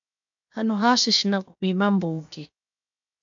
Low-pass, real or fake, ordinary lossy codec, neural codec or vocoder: 7.2 kHz; fake; AAC, 64 kbps; codec, 16 kHz, 0.7 kbps, FocalCodec